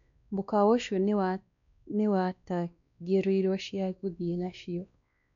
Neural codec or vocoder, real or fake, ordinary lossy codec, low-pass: codec, 16 kHz, 2 kbps, X-Codec, WavLM features, trained on Multilingual LibriSpeech; fake; none; 7.2 kHz